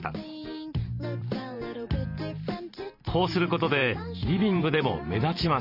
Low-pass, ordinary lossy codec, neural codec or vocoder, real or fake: 5.4 kHz; none; vocoder, 44.1 kHz, 128 mel bands every 512 samples, BigVGAN v2; fake